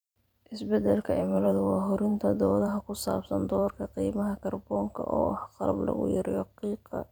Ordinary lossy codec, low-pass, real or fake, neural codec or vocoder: none; none; fake; vocoder, 44.1 kHz, 128 mel bands every 512 samples, BigVGAN v2